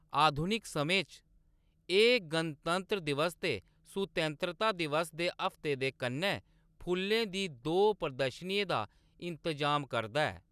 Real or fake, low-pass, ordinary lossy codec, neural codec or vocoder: real; 14.4 kHz; none; none